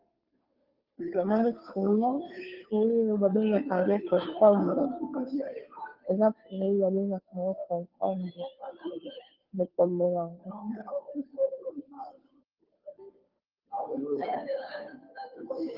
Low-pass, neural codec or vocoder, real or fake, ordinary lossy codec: 5.4 kHz; codec, 16 kHz, 2 kbps, FunCodec, trained on Chinese and English, 25 frames a second; fake; Opus, 32 kbps